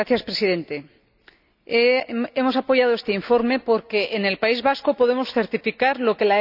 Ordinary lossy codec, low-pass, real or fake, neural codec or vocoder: none; 5.4 kHz; real; none